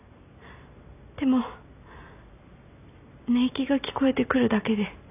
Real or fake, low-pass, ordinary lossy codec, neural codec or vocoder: real; 3.6 kHz; none; none